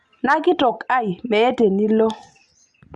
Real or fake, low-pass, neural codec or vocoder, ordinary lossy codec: real; 10.8 kHz; none; Opus, 64 kbps